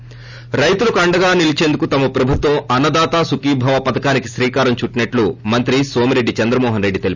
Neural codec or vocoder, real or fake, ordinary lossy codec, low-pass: none; real; none; 7.2 kHz